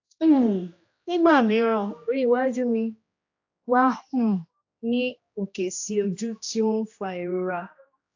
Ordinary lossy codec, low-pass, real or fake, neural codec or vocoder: AAC, 48 kbps; 7.2 kHz; fake; codec, 16 kHz, 1 kbps, X-Codec, HuBERT features, trained on general audio